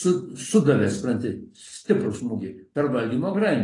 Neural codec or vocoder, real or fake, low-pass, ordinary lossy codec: none; real; 10.8 kHz; AAC, 32 kbps